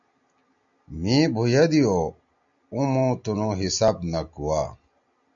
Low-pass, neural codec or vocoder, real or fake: 7.2 kHz; none; real